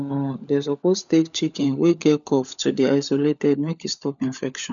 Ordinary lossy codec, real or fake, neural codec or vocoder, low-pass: none; fake; codec, 16 kHz, 16 kbps, FunCodec, trained on LibriTTS, 50 frames a second; 7.2 kHz